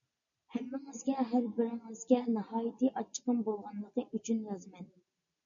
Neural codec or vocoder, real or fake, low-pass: none; real; 7.2 kHz